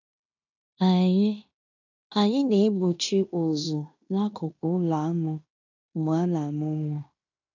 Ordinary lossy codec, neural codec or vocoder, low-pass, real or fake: none; codec, 16 kHz in and 24 kHz out, 0.9 kbps, LongCat-Audio-Codec, fine tuned four codebook decoder; 7.2 kHz; fake